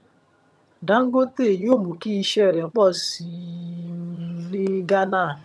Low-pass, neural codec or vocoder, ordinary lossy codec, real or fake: none; vocoder, 22.05 kHz, 80 mel bands, HiFi-GAN; none; fake